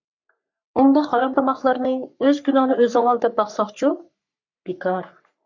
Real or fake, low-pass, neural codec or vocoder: fake; 7.2 kHz; codec, 44.1 kHz, 3.4 kbps, Pupu-Codec